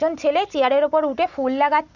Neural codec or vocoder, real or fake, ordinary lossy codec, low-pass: none; real; none; 7.2 kHz